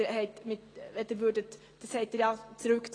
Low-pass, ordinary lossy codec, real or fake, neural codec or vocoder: 9.9 kHz; AAC, 32 kbps; real; none